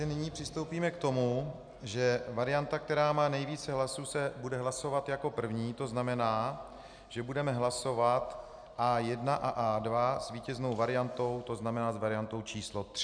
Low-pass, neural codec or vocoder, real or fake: 9.9 kHz; none; real